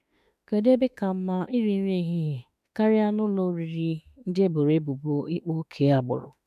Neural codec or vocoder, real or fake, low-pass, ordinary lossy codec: autoencoder, 48 kHz, 32 numbers a frame, DAC-VAE, trained on Japanese speech; fake; 14.4 kHz; none